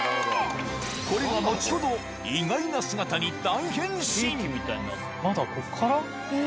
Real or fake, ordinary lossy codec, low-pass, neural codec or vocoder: real; none; none; none